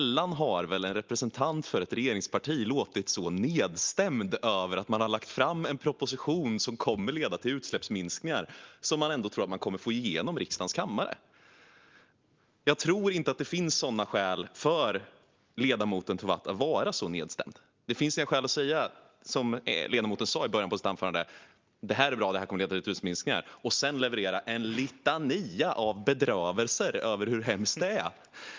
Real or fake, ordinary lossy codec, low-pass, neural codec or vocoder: real; Opus, 32 kbps; 7.2 kHz; none